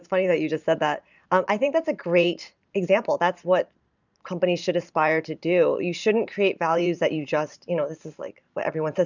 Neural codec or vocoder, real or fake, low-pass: vocoder, 44.1 kHz, 128 mel bands every 512 samples, BigVGAN v2; fake; 7.2 kHz